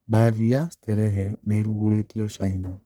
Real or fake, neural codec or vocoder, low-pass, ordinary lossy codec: fake; codec, 44.1 kHz, 1.7 kbps, Pupu-Codec; none; none